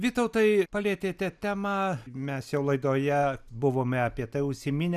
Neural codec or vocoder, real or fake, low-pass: none; real; 14.4 kHz